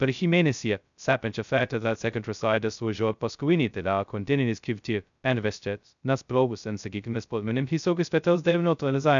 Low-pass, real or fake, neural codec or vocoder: 7.2 kHz; fake; codec, 16 kHz, 0.2 kbps, FocalCodec